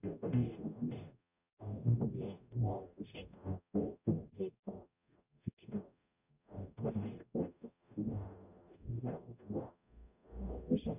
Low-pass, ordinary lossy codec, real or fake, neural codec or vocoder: 3.6 kHz; none; fake; codec, 44.1 kHz, 0.9 kbps, DAC